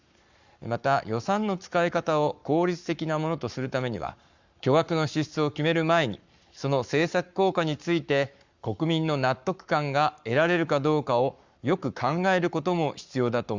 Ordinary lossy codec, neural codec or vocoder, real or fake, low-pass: Opus, 64 kbps; codec, 44.1 kHz, 7.8 kbps, Pupu-Codec; fake; 7.2 kHz